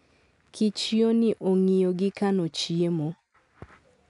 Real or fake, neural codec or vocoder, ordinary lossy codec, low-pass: real; none; none; 10.8 kHz